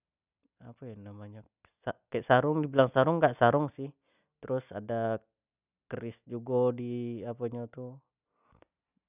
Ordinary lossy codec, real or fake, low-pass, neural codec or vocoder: none; real; 3.6 kHz; none